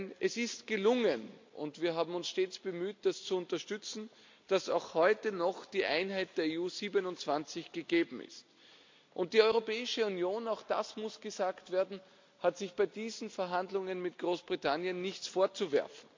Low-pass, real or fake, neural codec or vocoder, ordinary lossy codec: 7.2 kHz; real; none; MP3, 64 kbps